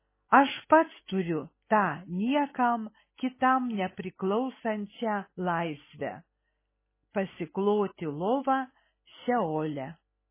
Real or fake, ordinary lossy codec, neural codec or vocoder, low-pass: real; MP3, 16 kbps; none; 3.6 kHz